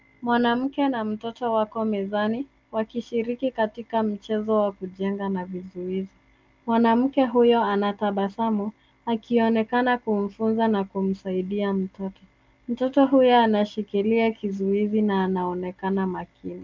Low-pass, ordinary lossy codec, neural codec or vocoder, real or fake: 7.2 kHz; Opus, 24 kbps; none; real